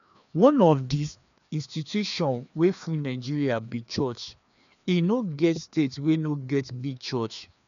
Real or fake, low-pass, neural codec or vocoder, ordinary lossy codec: fake; 7.2 kHz; codec, 16 kHz, 2 kbps, FreqCodec, larger model; none